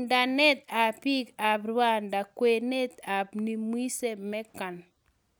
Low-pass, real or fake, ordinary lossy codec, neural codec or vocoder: none; real; none; none